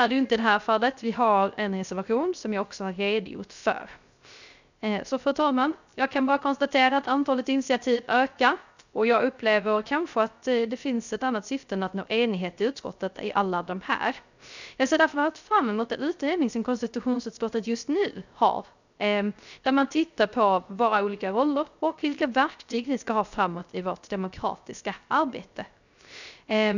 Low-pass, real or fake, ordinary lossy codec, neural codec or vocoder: 7.2 kHz; fake; none; codec, 16 kHz, 0.3 kbps, FocalCodec